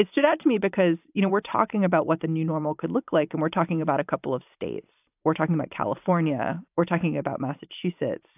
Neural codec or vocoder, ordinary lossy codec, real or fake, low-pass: vocoder, 44.1 kHz, 128 mel bands every 512 samples, BigVGAN v2; AAC, 32 kbps; fake; 3.6 kHz